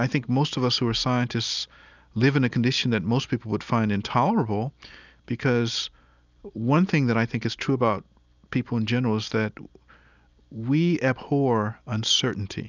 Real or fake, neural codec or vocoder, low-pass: real; none; 7.2 kHz